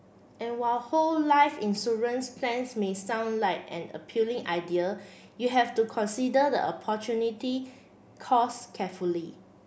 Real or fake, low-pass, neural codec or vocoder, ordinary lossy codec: real; none; none; none